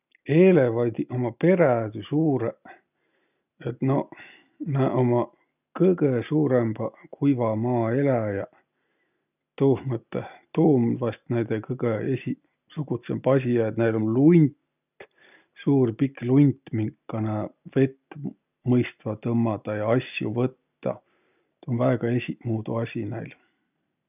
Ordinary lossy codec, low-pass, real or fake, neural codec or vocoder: none; 3.6 kHz; real; none